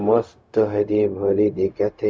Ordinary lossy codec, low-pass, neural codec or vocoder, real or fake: none; none; codec, 16 kHz, 0.4 kbps, LongCat-Audio-Codec; fake